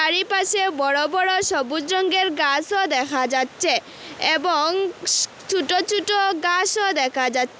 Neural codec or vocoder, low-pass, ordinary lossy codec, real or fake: none; none; none; real